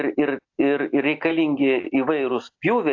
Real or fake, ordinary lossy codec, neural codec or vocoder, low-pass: real; AAC, 48 kbps; none; 7.2 kHz